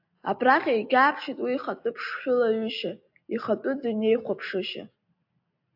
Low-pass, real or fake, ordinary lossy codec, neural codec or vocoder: 5.4 kHz; real; AAC, 48 kbps; none